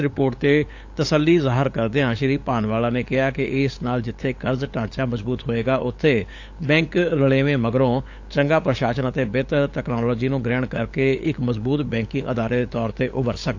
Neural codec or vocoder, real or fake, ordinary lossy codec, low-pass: codec, 16 kHz, 8 kbps, FunCodec, trained on LibriTTS, 25 frames a second; fake; AAC, 48 kbps; 7.2 kHz